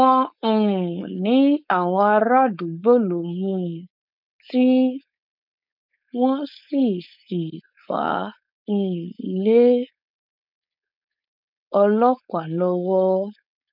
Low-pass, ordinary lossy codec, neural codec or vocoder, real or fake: 5.4 kHz; none; codec, 16 kHz, 4.8 kbps, FACodec; fake